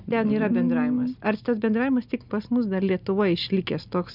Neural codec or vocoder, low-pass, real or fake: none; 5.4 kHz; real